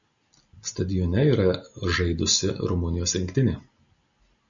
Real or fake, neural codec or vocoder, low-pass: real; none; 7.2 kHz